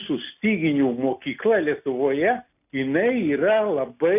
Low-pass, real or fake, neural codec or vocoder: 3.6 kHz; real; none